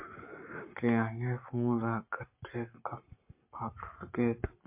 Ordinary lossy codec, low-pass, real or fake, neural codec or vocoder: MP3, 32 kbps; 3.6 kHz; fake; codec, 44.1 kHz, 7.8 kbps, Pupu-Codec